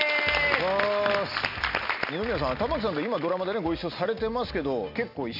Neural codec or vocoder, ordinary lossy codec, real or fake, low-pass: none; none; real; 5.4 kHz